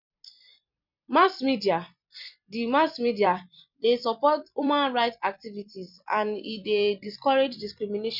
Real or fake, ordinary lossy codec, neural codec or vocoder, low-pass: fake; none; vocoder, 44.1 kHz, 128 mel bands every 256 samples, BigVGAN v2; 5.4 kHz